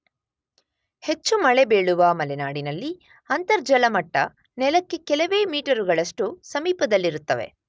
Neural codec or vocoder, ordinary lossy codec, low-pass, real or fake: none; none; none; real